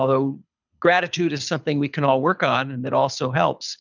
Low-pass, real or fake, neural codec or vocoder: 7.2 kHz; fake; codec, 24 kHz, 6 kbps, HILCodec